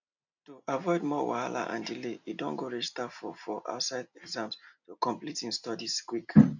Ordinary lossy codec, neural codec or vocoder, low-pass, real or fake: none; none; 7.2 kHz; real